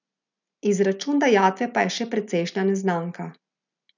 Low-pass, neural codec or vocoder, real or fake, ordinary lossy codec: 7.2 kHz; none; real; none